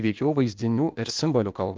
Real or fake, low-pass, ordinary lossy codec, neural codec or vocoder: fake; 7.2 kHz; Opus, 24 kbps; codec, 16 kHz, 0.8 kbps, ZipCodec